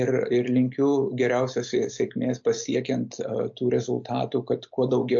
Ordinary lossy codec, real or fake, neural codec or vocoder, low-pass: MP3, 48 kbps; real; none; 7.2 kHz